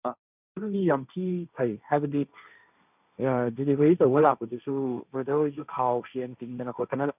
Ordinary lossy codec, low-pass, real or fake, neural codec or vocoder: none; 3.6 kHz; fake; codec, 16 kHz, 1.1 kbps, Voila-Tokenizer